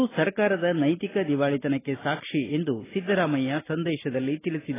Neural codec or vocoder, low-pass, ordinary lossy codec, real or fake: none; 3.6 kHz; AAC, 16 kbps; real